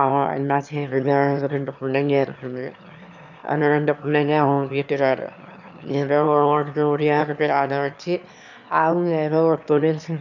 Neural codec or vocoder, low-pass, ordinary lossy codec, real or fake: autoencoder, 22.05 kHz, a latent of 192 numbers a frame, VITS, trained on one speaker; 7.2 kHz; none; fake